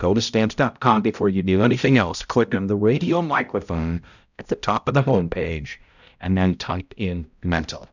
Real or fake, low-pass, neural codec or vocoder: fake; 7.2 kHz; codec, 16 kHz, 0.5 kbps, X-Codec, HuBERT features, trained on balanced general audio